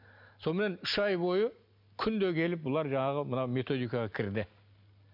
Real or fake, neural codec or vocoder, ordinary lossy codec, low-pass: real; none; none; 5.4 kHz